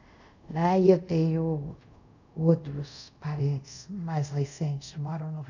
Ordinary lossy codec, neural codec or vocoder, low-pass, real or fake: none; codec, 24 kHz, 0.5 kbps, DualCodec; 7.2 kHz; fake